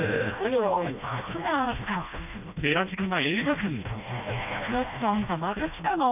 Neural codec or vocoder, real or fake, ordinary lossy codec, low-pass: codec, 16 kHz, 1 kbps, FreqCodec, smaller model; fake; none; 3.6 kHz